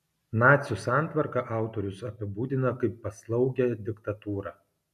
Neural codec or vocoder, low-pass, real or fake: none; 14.4 kHz; real